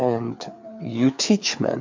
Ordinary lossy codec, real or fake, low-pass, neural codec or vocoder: MP3, 48 kbps; fake; 7.2 kHz; codec, 16 kHz, 4 kbps, FreqCodec, larger model